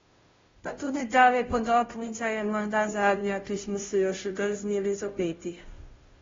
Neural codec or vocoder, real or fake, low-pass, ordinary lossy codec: codec, 16 kHz, 0.5 kbps, FunCodec, trained on Chinese and English, 25 frames a second; fake; 7.2 kHz; AAC, 24 kbps